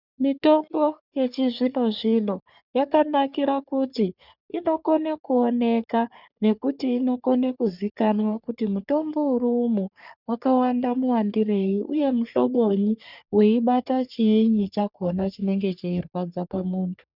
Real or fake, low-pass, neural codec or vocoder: fake; 5.4 kHz; codec, 44.1 kHz, 3.4 kbps, Pupu-Codec